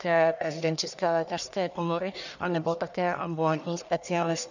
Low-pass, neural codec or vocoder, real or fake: 7.2 kHz; codec, 44.1 kHz, 1.7 kbps, Pupu-Codec; fake